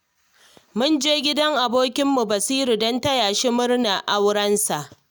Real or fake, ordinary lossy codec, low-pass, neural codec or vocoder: real; none; none; none